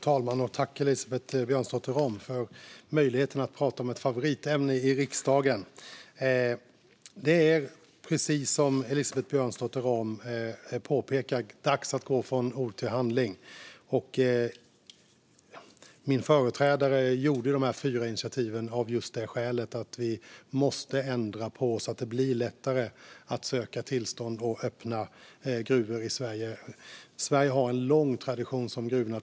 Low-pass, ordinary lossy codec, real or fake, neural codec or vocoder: none; none; real; none